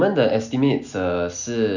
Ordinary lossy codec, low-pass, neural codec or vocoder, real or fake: none; 7.2 kHz; none; real